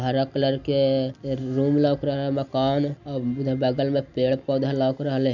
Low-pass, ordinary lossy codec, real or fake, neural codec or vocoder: 7.2 kHz; none; real; none